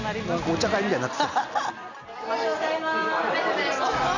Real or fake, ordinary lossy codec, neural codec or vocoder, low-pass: real; none; none; 7.2 kHz